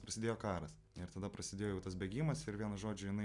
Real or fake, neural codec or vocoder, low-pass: real; none; 10.8 kHz